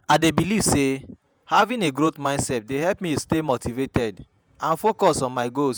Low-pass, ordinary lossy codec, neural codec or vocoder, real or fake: none; none; vocoder, 48 kHz, 128 mel bands, Vocos; fake